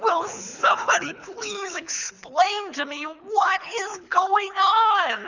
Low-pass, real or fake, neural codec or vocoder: 7.2 kHz; fake; codec, 24 kHz, 3 kbps, HILCodec